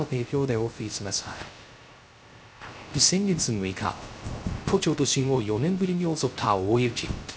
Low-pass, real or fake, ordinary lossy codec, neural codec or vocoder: none; fake; none; codec, 16 kHz, 0.3 kbps, FocalCodec